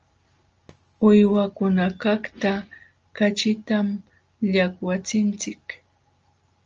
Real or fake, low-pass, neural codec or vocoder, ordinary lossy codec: real; 7.2 kHz; none; Opus, 24 kbps